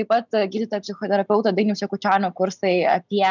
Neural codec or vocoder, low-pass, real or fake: none; 7.2 kHz; real